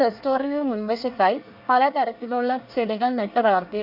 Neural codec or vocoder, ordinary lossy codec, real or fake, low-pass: codec, 24 kHz, 1 kbps, SNAC; none; fake; 5.4 kHz